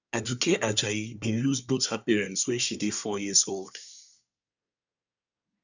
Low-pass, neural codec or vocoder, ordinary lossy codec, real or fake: 7.2 kHz; codec, 24 kHz, 1 kbps, SNAC; none; fake